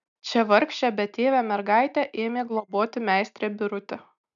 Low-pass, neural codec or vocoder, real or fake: 7.2 kHz; none; real